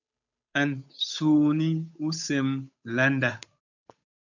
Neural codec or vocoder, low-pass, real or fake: codec, 16 kHz, 8 kbps, FunCodec, trained on Chinese and English, 25 frames a second; 7.2 kHz; fake